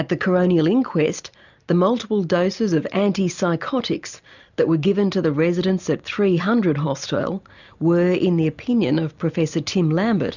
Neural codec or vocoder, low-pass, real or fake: none; 7.2 kHz; real